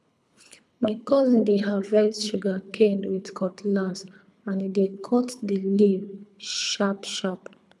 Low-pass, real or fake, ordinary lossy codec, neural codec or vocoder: none; fake; none; codec, 24 kHz, 3 kbps, HILCodec